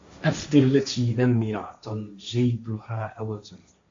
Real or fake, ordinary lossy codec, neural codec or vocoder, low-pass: fake; MP3, 48 kbps; codec, 16 kHz, 1.1 kbps, Voila-Tokenizer; 7.2 kHz